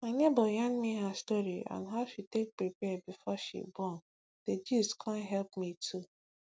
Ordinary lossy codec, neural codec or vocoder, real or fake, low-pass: none; none; real; none